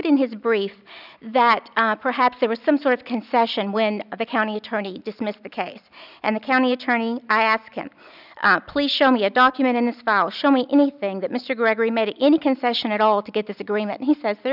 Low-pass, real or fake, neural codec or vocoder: 5.4 kHz; real; none